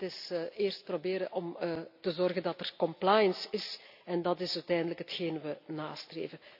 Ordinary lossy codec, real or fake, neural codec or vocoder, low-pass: none; real; none; 5.4 kHz